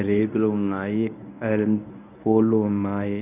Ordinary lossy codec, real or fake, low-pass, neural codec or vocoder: none; fake; 3.6 kHz; codec, 24 kHz, 0.9 kbps, WavTokenizer, medium speech release version 1